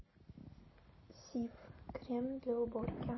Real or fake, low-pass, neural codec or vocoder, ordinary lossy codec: real; 7.2 kHz; none; MP3, 24 kbps